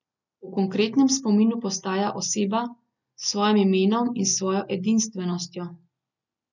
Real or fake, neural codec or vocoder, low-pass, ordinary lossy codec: real; none; 7.2 kHz; none